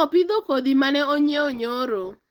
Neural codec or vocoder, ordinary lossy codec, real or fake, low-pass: none; Opus, 16 kbps; real; 19.8 kHz